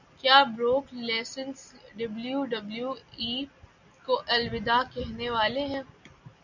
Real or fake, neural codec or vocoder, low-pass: real; none; 7.2 kHz